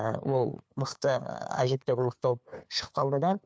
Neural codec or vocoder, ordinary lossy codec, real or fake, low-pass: codec, 16 kHz, 2 kbps, FunCodec, trained on LibriTTS, 25 frames a second; none; fake; none